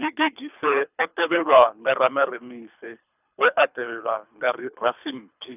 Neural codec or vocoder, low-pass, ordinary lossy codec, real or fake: codec, 24 kHz, 3 kbps, HILCodec; 3.6 kHz; none; fake